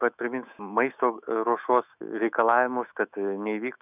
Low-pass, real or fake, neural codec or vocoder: 3.6 kHz; fake; autoencoder, 48 kHz, 128 numbers a frame, DAC-VAE, trained on Japanese speech